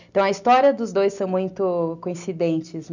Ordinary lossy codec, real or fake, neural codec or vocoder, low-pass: none; real; none; 7.2 kHz